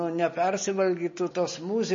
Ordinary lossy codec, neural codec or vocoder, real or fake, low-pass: MP3, 32 kbps; none; real; 7.2 kHz